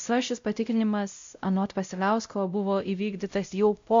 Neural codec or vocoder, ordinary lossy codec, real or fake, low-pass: codec, 16 kHz, 0.5 kbps, X-Codec, WavLM features, trained on Multilingual LibriSpeech; MP3, 64 kbps; fake; 7.2 kHz